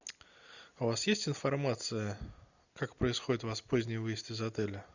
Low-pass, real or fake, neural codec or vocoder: 7.2 kHz; real; none